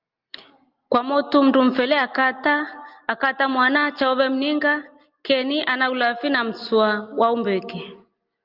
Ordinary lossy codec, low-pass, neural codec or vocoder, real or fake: Opus, 24 kbps; 5.4 kHz; none; real